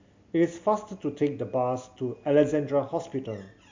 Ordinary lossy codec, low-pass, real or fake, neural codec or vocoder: MP3, 64 kbps; 7.2 kHz; real; none